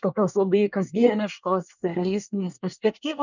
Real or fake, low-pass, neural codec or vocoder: fake; 7.2 kHz; codec, 24 kHz, 1 kbps, SNAC